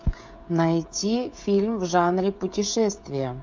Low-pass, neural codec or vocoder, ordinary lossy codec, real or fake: 7.2 kHz; codec, 16 kHz, 16 kbps, FreqCodec, smaller model; MP3, 64 kbps; fake